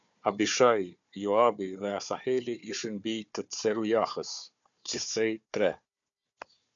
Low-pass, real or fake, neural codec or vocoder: 7.2 kHz; fake; codec, 16 kHz, 4 kbps, FunCodec, trained on Chinese and English, 50 frames a second